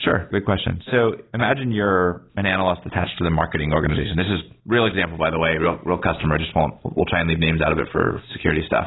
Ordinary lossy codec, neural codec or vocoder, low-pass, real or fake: AAC, 16 kbps; none; 7.2 kHz; real